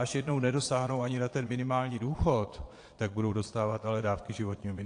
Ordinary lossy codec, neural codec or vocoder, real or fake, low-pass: AAC, 48 kbps; vocoder, 22.05 kHz, 80 mel bands, Vocos; fake; 9.9 kHz